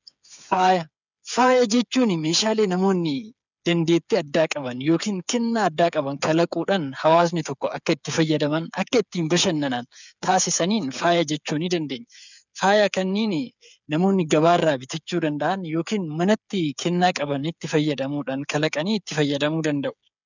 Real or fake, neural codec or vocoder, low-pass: fake; codec, 16 kHz, 8 kbps, FreqCodec, smaller model; 7.2 kHz